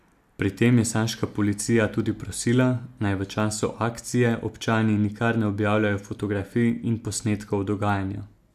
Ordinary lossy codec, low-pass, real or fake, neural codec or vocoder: none; 14.4 kHz; real; none